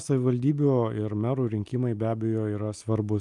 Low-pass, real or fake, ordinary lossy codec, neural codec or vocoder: 10.8 kHz; real; Opus, 32 kbps; none